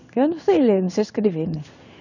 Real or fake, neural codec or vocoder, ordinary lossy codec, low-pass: fake; codec, 16 kHz in and 24 kHz out, 1 kbps, XY-Tokenizer; none; 7.2 kHz